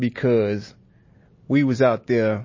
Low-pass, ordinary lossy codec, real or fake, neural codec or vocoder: 7.2 kHz; MP3, 32 kbps; real; none